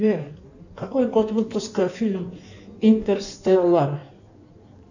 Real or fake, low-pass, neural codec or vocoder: fake; 7.2 kHz; codec, 16 kHz in and 24 kHz out, 1.1 kbps, FireRedTTS-2 codec